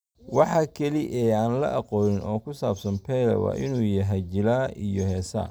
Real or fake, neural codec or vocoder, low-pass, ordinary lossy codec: real; none; none; none